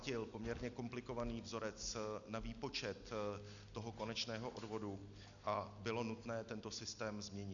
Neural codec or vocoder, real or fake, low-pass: none; real; 7.2 kHz